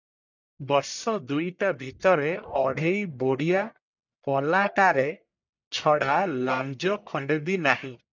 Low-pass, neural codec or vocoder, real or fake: 7.2 kHz; codec, 44.1 kHz, 1.7 kbps, Pupu-Codec; fake